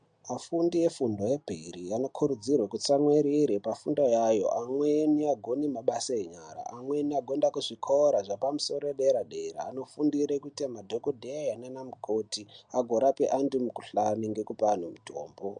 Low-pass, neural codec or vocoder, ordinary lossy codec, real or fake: 9.9 kHz; none; MP3, 64 kbps; real